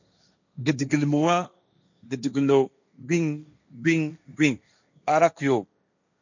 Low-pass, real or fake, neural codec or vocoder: 7.2 kHz; fake; codec, 16 kHz, 1.1 kbps, Voila-Tokenizer